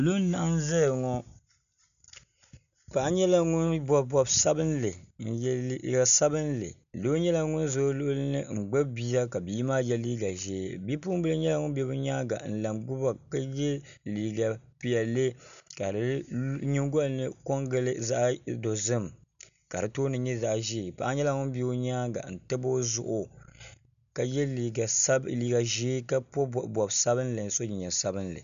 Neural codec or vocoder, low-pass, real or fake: none; 7.2 kHz; real